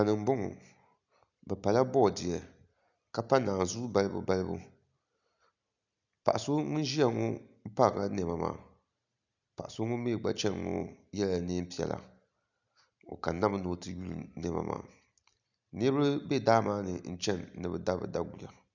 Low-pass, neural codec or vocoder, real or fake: 7.2 kHz; none; real